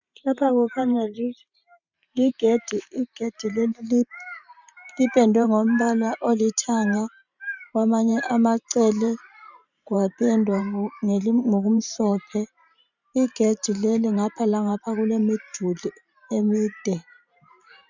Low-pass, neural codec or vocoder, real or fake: 7.2 kHz; vocoder, 44.1 kHz, 128 mel bands every 512 samples, BigVGAN v2; fake